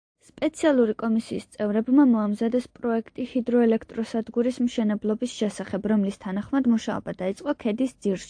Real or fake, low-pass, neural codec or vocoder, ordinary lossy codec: real; 9.9 kHz; none; AAC, 64 kbps